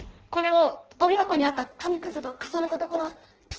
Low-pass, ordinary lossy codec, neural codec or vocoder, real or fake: 7.2 kHz; Opus, 16 kbps; codec, 16 kHz in and 24 kHz out, 0.6 kbps, FireRedTTS-2 codec; fake